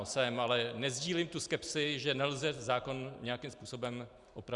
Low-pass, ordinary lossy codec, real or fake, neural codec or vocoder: 10.8 kHz; Opus, 64 kbps; fake; vocoder, 44.1 kHz, 128 mel bands every 256 samples, BigVGAN v2